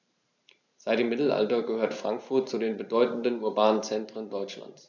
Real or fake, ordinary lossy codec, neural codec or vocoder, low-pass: fake; none; vocoder, 44.1 kHz, 128 mel bands every 512 samples, BigVGAN v2; 7.2 kHz